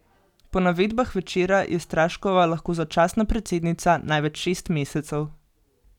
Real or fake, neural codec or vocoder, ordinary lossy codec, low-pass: real; none; none; 19.8 kHz